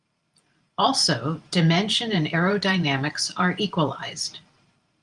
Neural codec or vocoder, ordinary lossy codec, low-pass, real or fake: vocoder, 44.1 kHz, 128 mel bands every 512 samples, BigVGAN v2; Opus, 32 kbps; 10.8 kHz; fake